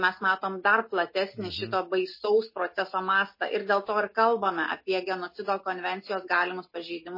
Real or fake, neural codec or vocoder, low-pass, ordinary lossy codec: real; none; 5.4 kHz; MP3, 24 kbps